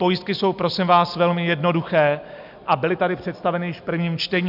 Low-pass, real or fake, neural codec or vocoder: 5.4 kHz; real; none